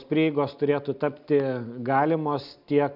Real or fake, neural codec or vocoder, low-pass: real; none; 5.4 kHz